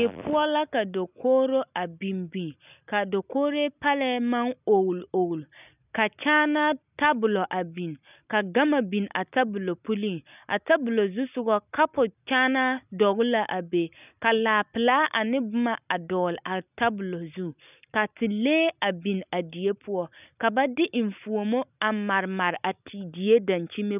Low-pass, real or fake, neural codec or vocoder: 3.6 kHz; real; none